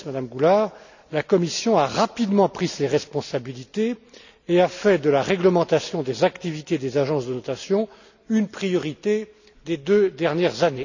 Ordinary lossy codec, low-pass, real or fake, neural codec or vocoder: none; 7.2 kHz; real; none